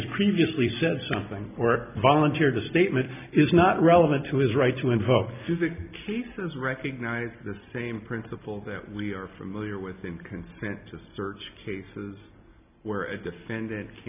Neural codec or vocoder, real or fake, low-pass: none; real; 3.6 kHz